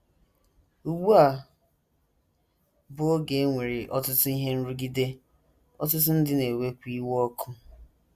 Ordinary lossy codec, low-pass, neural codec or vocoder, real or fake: Opus, 64 kbps; 14.4 kHz; none; real